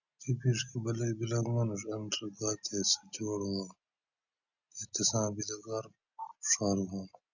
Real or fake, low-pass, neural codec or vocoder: real; 7.2 kHz; none